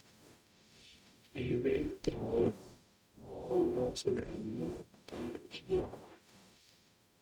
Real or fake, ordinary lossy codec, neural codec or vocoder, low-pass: fake; none; codec, 44.1 kHz, 0.9 kbps, DAC; 19.8 kHz